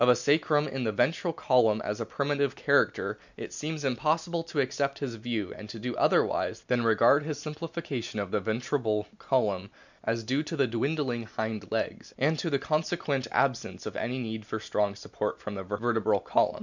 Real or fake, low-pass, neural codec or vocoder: real; 7.2 kHz; none